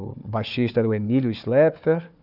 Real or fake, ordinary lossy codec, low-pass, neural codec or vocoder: fake; none; 5.4 kHz; codec, 16 kHz, 4 kbps, FunCodec, trained on Chinese and English, 50 frames a second